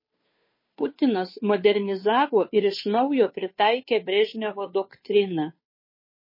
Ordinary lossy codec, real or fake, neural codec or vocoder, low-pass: MP3, 24 kbps; fake; codec, 16 kHz, 2 kbps, FunCodec, trained on Chinese and English, 25 frames a second; 5.4 kHz